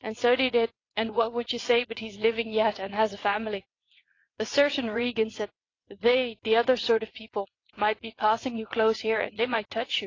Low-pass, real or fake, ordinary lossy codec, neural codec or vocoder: 7.2 kHz; fake; AAC, 32 kbps; vocoder, 22.05 kHz, 80 mel bands, WaveNeXt